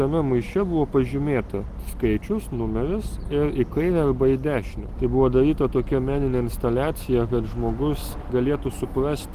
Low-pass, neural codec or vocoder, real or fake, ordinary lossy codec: 14.4 kHz; none; real; Opus, 24 kbps